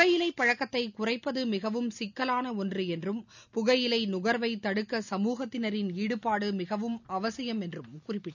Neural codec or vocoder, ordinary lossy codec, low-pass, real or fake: none; none; 7.2 kHz; real